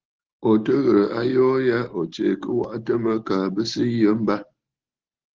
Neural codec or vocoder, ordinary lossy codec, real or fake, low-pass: none; Opus, 16 kbps; real; 7.2 kHz